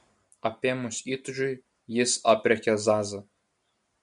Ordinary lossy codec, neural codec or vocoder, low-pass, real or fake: MP3, 64 kbps; none; 10.8 kHz; real